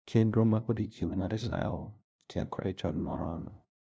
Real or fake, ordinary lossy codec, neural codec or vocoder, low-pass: fake; none; codec, 16 kHz, 0.5 kbps, FunCodec, trained on LibriTTS, 25 frames a second; none